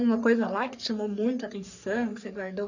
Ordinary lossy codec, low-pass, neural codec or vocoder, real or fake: none; 7.2 kHz; codec, 44.1 kHz, 3.4 kbps, Pupu-Codec; fake